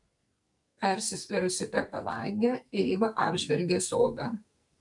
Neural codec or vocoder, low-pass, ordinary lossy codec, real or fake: codec, 44.1 kHz, 2.6 kbps, SNAC; 10.8 kHz; MP3, 96 kbps; fake